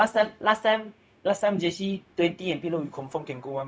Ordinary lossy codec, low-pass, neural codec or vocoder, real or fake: none; none; codec, 16 kHz, 0.4 kbps, LongCat-Audio-Codec; fake